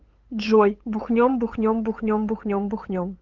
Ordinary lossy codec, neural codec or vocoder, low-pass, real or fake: Opus, 16 kbps; codec, 16 kHz, 8 kbps, FunCodec, trained on LibriTTS, 25 frames a second; 7.2 kHz; fake